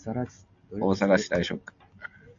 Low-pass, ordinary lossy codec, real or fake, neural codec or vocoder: 7.2 kHz; AAC, 64 kbps; real; none